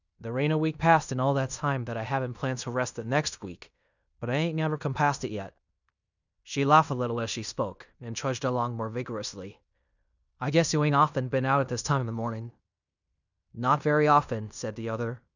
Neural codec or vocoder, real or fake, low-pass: codec, 16 kHz in and 24 kHz out, 0.9 kbps, LongCat-Audio-Codec, fine tuned four codebook decoder; fake; 7.2 kHz